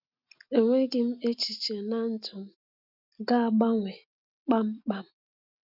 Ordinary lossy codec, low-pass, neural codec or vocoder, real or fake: none; 5.4 kHz; none; real